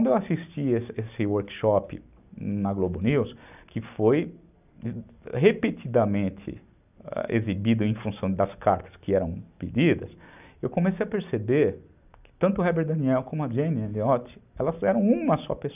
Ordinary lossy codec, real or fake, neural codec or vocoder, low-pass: none; real; none; 3.6 kHz